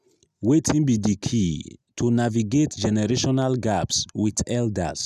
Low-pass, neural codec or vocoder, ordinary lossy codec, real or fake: 14.4 kHz; none; none; real